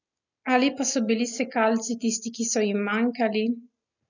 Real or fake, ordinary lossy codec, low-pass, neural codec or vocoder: real; none; 7.2 kHz; none